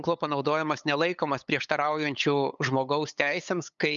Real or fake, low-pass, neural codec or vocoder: fake; 7.2 kHz; codec, 16 kHz, 8 kbps, FunCodec, trained on LibriTTS, 25 frames a second